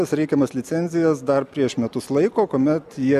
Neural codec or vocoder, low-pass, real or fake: none; 14.4 kHz; real